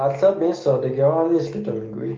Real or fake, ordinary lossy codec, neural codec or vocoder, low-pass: real; Opus, 24 kbps; none; 7.2 kHz